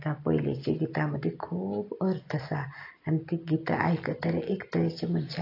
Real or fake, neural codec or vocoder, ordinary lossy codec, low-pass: real; none; AAC, 32 kbps; 5.4 kHz